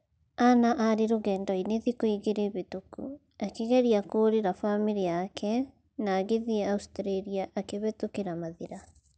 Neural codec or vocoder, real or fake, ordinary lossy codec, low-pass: none; real; none; none